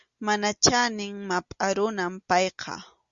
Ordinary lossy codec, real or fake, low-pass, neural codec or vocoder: Opus, 64 kbps; real; 7.2 kHz; none